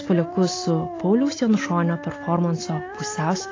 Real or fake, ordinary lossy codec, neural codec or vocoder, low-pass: real; AAC, 32 kbps; none; 7.2 kHz